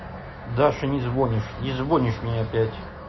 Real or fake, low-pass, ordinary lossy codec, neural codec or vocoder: real; 7.2 kHz; MP3, 24 kbps; none